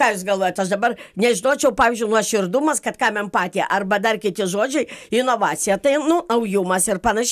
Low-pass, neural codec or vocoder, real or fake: 14.4 kHz; none; real